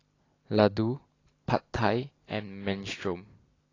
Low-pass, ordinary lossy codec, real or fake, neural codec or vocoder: 7.2 kHz; AAC, 32 kbps; real; none